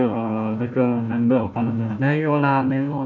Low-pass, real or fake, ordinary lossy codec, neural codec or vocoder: 7.2 kHz; fake; none; codec, 16 kHz, 1 kbps, FunCodec, trained on Chinese and English, 50 frames a second